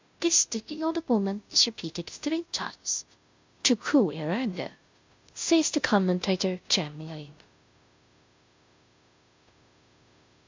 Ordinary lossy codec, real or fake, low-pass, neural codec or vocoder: MP3, 64 kbps; fake; 7.2 kHz; codec, 16 kHz, 0.5 kbps, FunCodec, trained on Chinese and English, 25 frames a second